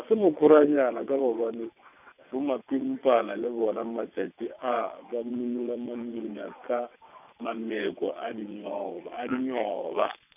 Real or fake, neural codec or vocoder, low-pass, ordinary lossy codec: fake; vocoder, 22.05 kHz, 80 mel bands, WaveNeXt; 3.6 kHz; none